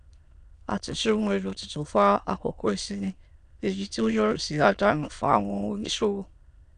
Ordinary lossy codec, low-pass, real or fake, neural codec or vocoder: none; 9.9 kHz; fake; autoencoder, 22.05 kHz, a latent of 192 numbers a frame, VITS, trained on many speakers